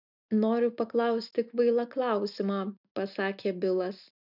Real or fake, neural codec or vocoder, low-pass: real; none; 5.4 kHz